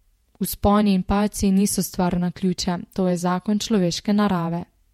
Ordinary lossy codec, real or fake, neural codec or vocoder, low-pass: MP3, 64 kbps; fake; vocoder, 48 kHz, 128 mel bands, Vocos; 19.8 kHz